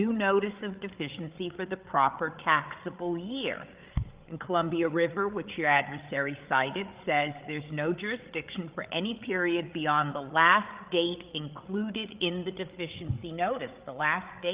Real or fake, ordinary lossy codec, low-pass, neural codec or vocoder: fake; Opus, 32 kbps; 3.6 kHz; codec, 16 kHz, 8 kbps, FreqCodec, larger model